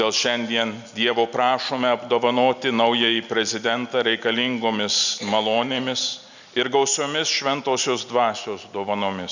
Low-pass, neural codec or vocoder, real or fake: 7.2 kHz; none; real